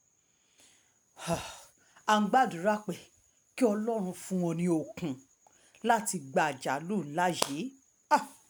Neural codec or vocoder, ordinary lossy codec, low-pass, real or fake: none; none; none; real